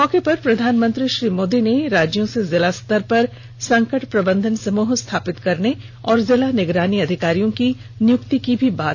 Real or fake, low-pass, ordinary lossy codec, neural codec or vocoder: real; none; none; none